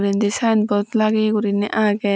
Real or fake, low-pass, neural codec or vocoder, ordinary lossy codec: real; none; none; none